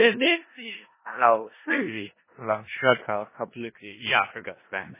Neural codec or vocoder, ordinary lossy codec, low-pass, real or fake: codec, 16 kHz in and 24 kHz out, 0.4 kbps, LongCat-Audio-Codec, four codebook decoder; MP3, 16 kbps; 3.6 kHz; fake